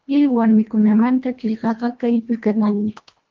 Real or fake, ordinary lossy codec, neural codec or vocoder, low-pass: fake; Opus, 32 kbps; codec, 24 kHz, 1.5 kbps, HILCodec; 7.2 kHz